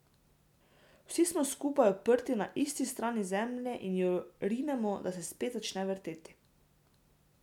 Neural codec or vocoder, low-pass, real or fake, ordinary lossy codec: none; 19.8 kHz; real; none